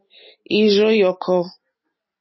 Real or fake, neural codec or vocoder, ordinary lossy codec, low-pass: real; none; MP3, 24 kbps; 7.2 kHz